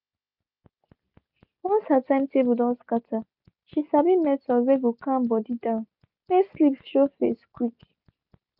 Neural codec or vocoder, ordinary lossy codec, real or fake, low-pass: none; none; real; 5.4 kHz